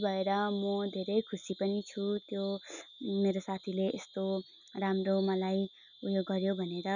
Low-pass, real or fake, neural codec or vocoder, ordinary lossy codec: 7.2 kHz; real; none; none